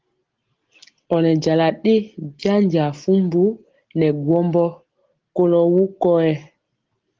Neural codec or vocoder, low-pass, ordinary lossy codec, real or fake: none; 7.2 kHz; Opus, 16 kbps; real